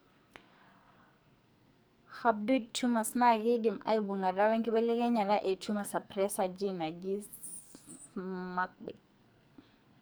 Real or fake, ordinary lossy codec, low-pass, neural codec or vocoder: fake; none; none; codec, 44.1 kHz, 2.6 kbps, SNAC